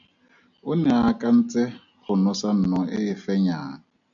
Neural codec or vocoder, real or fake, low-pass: none; real; 7.2 kHz